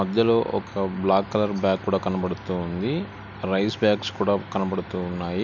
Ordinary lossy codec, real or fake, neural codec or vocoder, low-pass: none; real; none; 7.2 kHz